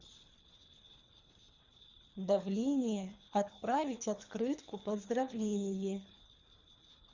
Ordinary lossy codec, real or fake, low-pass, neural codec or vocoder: Opus, 64 kbps; fake; 7.2 kHz; codec, 24 kHz, 3 kbps, HILCodec